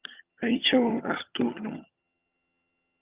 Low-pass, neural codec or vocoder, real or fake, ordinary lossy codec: 3.6 kHz; vocoder, 22.05 kHz, 80 mel bands, HiFi-GAN; fake; Opus, 24 kbps